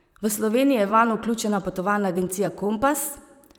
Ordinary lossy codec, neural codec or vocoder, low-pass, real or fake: none; vocoder, 44.1 kHz, 128 mel bands, Pupu-Vocoder; none; fake